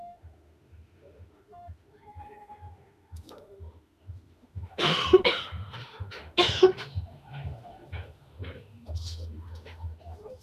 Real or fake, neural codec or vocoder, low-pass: fake; autoencoder, 48 kHz, 32 numbers a frame, DAC-VAE, trained on Japanese speech; 14.4 kHz